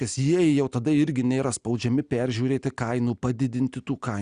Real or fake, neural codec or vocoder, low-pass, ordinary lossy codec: real; none; 9.9 kHz; Opus, 64 kbps